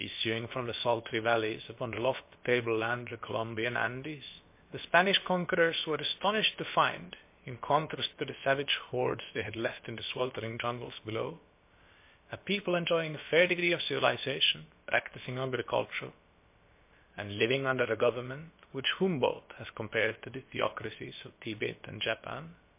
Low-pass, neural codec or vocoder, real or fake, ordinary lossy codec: 3.6 kHz; codec, 16 kHz, about 1 kbps, DyCAST, with the encoder's durations; fake; MP3, 24 kbps